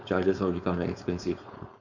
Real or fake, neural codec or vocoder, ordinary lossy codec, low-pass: fake; codec, 16 kHz, 4.8 kbps, FACodec; none; 7.2 kHz